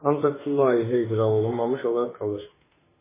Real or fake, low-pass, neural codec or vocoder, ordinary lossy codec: fake; 3.6 kHz; autoencoder, 48 kHz, 32 numbers a frame, DAC-VAE, trained on Japanese speech; MP3, 16 kbps